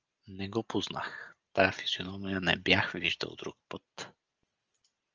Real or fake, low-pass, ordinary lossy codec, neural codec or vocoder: real; 7.2 kHz; Opus, 24 kbps; none